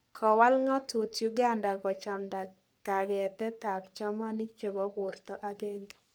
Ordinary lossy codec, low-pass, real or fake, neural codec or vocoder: none; none; fake; codec, 44.1 kHz, 3.4 kbps, Pupu-Codec